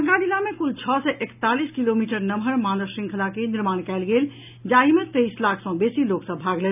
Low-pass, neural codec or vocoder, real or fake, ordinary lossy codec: 3.6 kHz; none; real; none